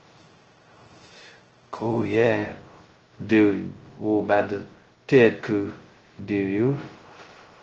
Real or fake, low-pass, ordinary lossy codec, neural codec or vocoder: fake; 7.2 kHz; Opus, 16 kbps; codec, 16 kHz, 0.2 kbps, FocalCodec